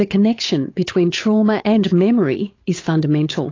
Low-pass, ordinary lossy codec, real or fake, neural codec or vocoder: 7.2 kHz; AAC, 32 kbps; fake; codec, 16 kHz, 8 kbps, FunCodec, trained on Chinese and English, 25 frames a second